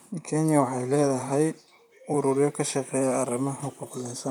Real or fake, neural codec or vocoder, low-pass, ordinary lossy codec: fake; vocoder, 44.1 kHz, 128 mel bands, Pupu-Vocoder; none; none